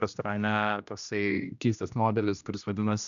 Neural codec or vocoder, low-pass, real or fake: codec, 16 kHz, 1 kbps, X-Codec, HuBERT features, trained on general audio; 7.2 kHz; fake